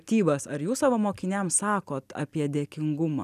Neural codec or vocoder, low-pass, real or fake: none; 14.4 kHz; real